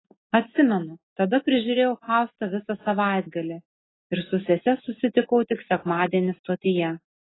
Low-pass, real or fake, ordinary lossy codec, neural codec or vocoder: 7.2 kHz; real; AAC, 16 kbps; none